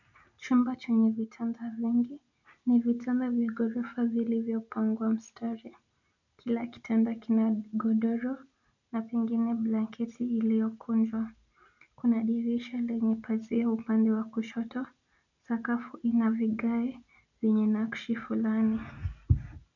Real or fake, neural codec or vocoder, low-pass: real; none; 7.2 kHz